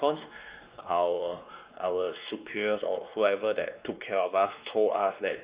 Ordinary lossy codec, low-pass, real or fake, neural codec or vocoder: Opus, 64 kbps; 3.6 kHz; fake; codec, 16 kHz, 2 kbps, X-Codec, WavLM features, trained on Multilingual LibriSpeech